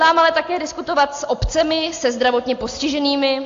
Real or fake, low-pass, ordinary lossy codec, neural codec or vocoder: real; 7.2 kHz; AAC, 48 kbps; none